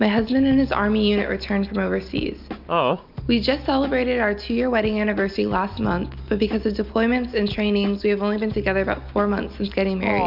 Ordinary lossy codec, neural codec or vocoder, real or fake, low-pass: MP3, 48 kbps; none; real; 5.4 kHz